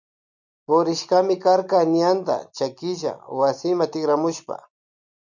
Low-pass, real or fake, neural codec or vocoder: 7.2 kHz; real; none